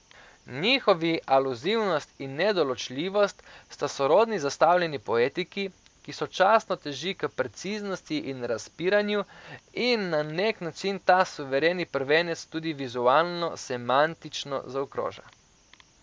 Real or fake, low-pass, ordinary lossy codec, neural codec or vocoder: real; none; none; none